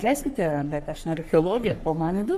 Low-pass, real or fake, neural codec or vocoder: 14.4 kHz; fake; codec, 44.1 kHz, 3.4 kbps, Pupu-Codec